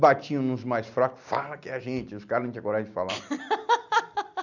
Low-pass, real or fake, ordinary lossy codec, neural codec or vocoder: 7.2 kHz; real; none; none